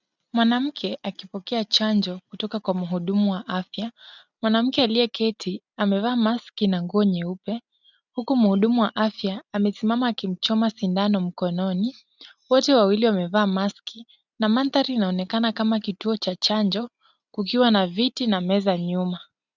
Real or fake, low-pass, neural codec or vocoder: real; 7.2 kHz; none